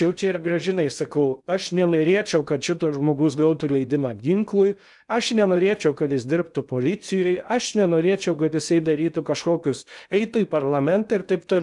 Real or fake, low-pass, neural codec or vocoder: fake; 10.8 kHz; codec, 16 kHz in and 24 kHz out, 0.8 kbps, FocalCodec, streaming, 65536 codes